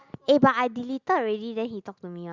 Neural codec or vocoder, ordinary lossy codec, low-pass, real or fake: none; Opus, 64 kbps; 7.2 kHz; real